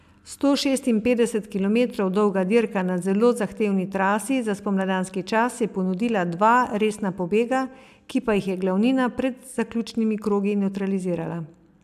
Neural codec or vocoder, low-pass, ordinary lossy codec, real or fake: none; 14.4 kHz; AAC, 96 kbps; real